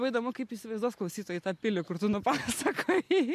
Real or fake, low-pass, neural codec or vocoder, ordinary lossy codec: real; 14.4 kHz; none; MP3, 64 kbps